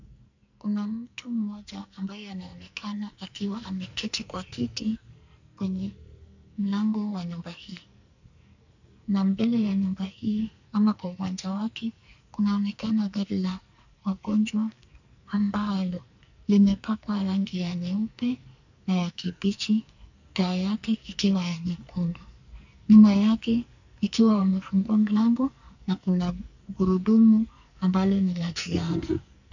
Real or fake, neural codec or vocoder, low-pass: fake; codec, 44.1 kHz, 2.6 kbps, SNAC; 7.2 kHz